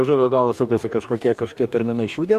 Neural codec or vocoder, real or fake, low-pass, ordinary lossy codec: codec, 44.1 kHz, 2.6 kbps, DAC; fake; 14.4 kHz; MP3, 96 kbps